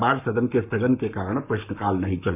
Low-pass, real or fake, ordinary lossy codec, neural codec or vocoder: 3.6 kHz; fake; none; codec, 44.1 kHz, 7.8 kbps, Pupu-Codec